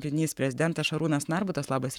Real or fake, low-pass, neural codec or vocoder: fake; 19.8 kHz; codec, 44.1 kHz, 7.8 kbps, Pupu-Codec